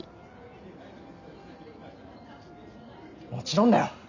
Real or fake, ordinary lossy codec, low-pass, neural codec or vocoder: real; none; 7.2 kHz; none